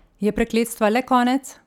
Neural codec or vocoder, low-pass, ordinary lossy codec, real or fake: none; 19.8 kHz; none; real